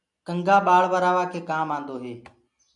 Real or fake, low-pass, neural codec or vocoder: real; 10.8 kHz; none